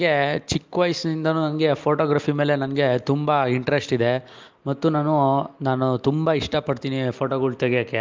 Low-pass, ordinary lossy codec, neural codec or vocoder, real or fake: 7.2 kHz; Opus, 32 kbps; none; real